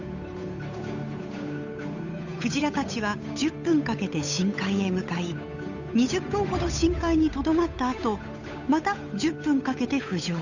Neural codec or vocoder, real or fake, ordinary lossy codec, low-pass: codec, 16 kHz, 8 kbps, FunCodec, trained on Chinese and English, 25 frames a second; fake; none; 7.2 kHz